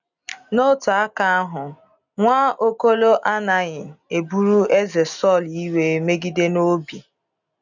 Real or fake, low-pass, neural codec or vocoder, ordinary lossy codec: real; 7.2 kHz; none; none